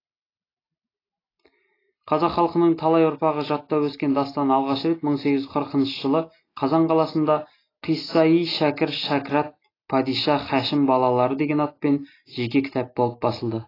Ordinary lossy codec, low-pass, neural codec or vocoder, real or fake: AAC, 24 kbps; 5.4 kHz; none; real